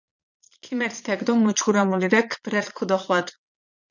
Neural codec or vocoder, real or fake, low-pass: vocoder, 22.05 kHz, 80 mel bands, Vocos; fake; 7.2 kHz